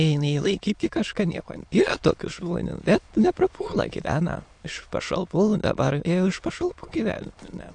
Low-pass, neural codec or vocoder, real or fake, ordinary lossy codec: 9.9 kHz; autoencoder, 22.05 kHz, a latent of 192 numbers a frame, VITS, trained on many speakers; fake; AAC, 64 kbps